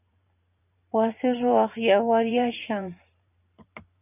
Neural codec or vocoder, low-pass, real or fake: vocoder, 44.1 kHz, 128 mel bands every 512 samples, BigVGAN v2; 3.6 kHz; fake